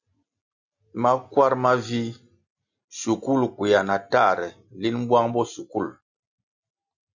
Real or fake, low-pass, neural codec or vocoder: real; 7.2 kHz; none